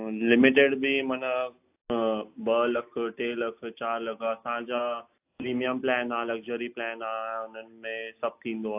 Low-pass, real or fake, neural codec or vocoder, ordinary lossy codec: 3.6 kHz; real; none; AAC, 32 kbps